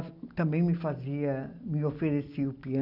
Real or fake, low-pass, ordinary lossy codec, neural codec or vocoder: real; 5.4 kHz; none; none